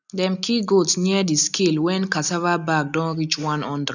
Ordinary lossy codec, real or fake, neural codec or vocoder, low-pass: none; real; none; 7.2 kHz